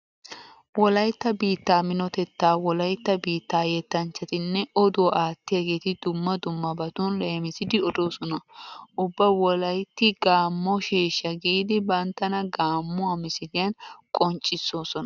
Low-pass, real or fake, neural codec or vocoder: 7.2 kHz; real; none